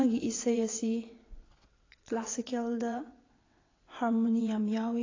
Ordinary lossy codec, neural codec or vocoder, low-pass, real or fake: AAC, 32 kbps; vocoder, 22.05 kHz, 80 mel bands, WaveNeXt; 7.2 kHz; fake